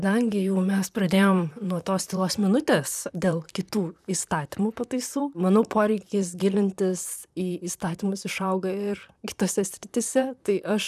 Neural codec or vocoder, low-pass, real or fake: vocoder, 44.1 kHz, 128 mel bands, Pupu-Vocoder; 14.4 kHz; fake